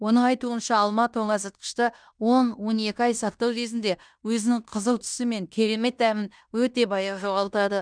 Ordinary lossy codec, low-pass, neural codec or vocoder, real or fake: none; 9.9 kHz; codec, 16 kHz in and 24 kHz out, 0.9 kbps, LongCat-Audio-Codec, fine tuned four codebook decoder; fake